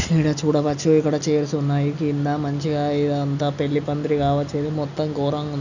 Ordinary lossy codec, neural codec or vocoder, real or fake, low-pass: none; none; real; 7.2 kHz